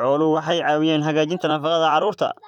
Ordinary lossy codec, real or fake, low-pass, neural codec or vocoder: none; fake; 19.8 kHz; vocoder, 44.1 kHz, 128 mel bands, Pupu-Vocoder